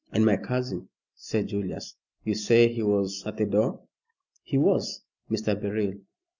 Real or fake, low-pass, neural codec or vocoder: real; 7.2 kHz; none